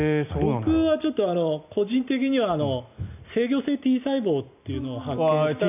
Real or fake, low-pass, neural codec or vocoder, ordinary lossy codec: real; 3.6 kHz; none; none